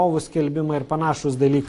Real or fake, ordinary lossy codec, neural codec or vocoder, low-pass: real; AAC, 48 kbps; none; 10.8 kHz